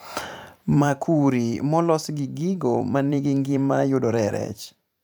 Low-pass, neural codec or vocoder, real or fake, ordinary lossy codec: none; vocoder, 44.1 kHz, 128 mel bands every 512 samples, BigVGAN v2; fake; none